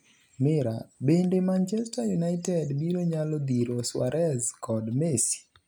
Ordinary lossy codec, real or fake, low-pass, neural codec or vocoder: none; real; none; none